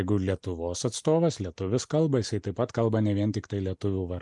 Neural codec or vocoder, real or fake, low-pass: none; real; 10.8 kHz